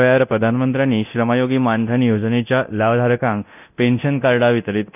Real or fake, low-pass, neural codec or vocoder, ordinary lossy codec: fake; 3.6 kHz; codec, 24 kHz, 0.9 kbps, DualCodec; none